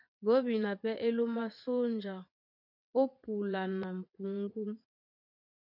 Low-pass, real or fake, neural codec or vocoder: 5.4 kHz; fake; vocoder, 22.05 kHz, 80 mel bands, WaveNeXt